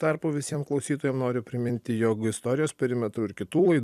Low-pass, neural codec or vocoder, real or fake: 14.4 kHz; vocoder, 44.1 kHz, 128 mel bands every 256 samples, BigVGAN v2; fake